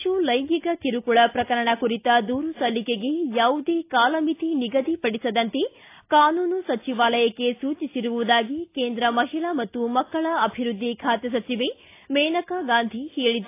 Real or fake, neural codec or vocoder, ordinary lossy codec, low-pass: real; none; AAC, 24 kbps; 3.6 kHz